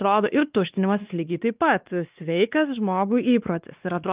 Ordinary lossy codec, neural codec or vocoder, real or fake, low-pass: Opus, 24 kbps; codec, 16 kHz, 2 kbps, X-Codec, WavLM features, trained on Multilingual LibriSpeech; fake; 3.6 kHz